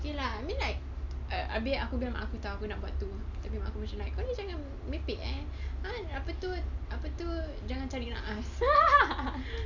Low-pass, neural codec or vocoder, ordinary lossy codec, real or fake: 7.2 kHz; none; none; real